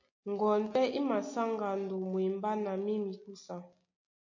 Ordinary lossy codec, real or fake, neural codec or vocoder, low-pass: MP3, 32 kbps; real; none; 7.2 kHz